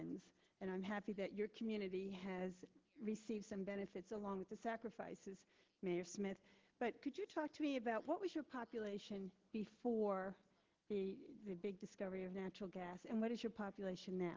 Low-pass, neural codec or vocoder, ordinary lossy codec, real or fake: 7.2 kHz; none; Opus, 16 kbps; real